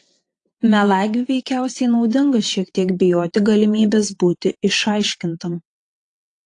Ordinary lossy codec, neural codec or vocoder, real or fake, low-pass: AAC, 48 kbps; vocoder, 22.05 kHz, 80 mel bands, WaveNeXt; fake; 9.9 kHz